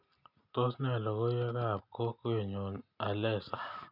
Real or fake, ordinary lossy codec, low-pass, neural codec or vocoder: real; none; 5.4 kHz; none